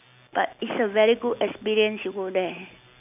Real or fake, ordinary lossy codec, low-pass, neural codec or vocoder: real; none; 3.6 kHz; none